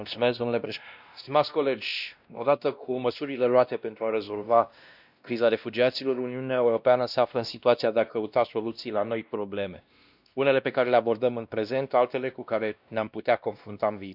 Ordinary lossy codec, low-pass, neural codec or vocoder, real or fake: none; 5.4 kHz; codec, 16 kHz, 1 kbps, X-Codec, WavLM features, trained on Multilingual LibriSpeech; fake